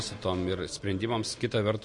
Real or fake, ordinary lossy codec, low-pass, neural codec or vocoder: real; MP3, 48 kbps; 10.8 kHz; none